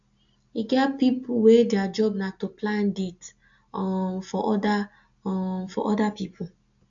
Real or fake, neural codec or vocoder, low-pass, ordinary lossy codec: real; none; 7.2 kHz; AAC, 64 kbps